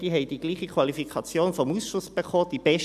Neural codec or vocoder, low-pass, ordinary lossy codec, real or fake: none; 14.4 kHz; none; real